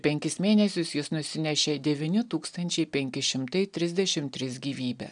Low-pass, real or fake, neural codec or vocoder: 9.9 kHz; real; none